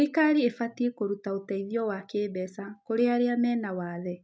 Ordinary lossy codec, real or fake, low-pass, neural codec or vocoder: none; real; none; none